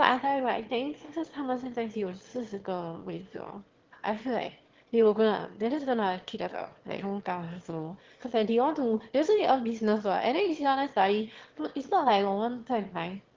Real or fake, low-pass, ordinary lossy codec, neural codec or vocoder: fake; 7.2 kHz; Opus, 16 kbps; autoencoder, 22.05 kHz, a latent of 192 numbers a frame, VITS, trained on one speaker